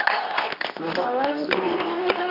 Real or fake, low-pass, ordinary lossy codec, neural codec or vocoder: fake; 5.4 kHz; none; codec, 24 kHz, 0.9 kbps, WavTokenizer, medium speech release version 2